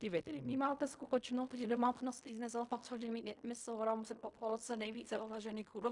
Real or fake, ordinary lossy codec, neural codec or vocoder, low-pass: fake; Opus, 64 kbps; codec, 16 kHz in and 24 kHz out, 0.4 kbps, LongCat-Audio-Codec, fine tuned four codebook decoder; 10.8 kHz